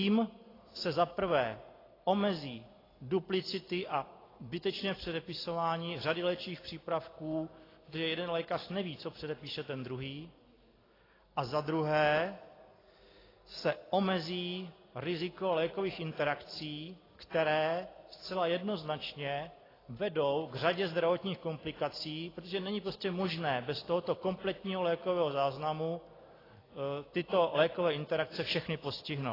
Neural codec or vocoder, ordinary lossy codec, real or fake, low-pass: none; AAC, 24 kbps; real; 5.4 kHz